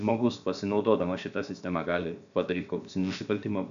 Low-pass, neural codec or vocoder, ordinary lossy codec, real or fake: 7.2 kHz; codec, 16 kHz, about 1 kbps, DyCAST, with the encoder's durations; MP3, 96 kbps; fake